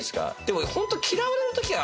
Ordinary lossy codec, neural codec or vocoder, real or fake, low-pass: none; none; real; none